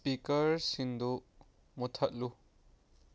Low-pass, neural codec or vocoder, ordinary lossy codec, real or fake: none; none; none; real